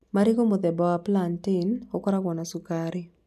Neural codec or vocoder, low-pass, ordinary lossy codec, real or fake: none; 14.4 kHz; none; real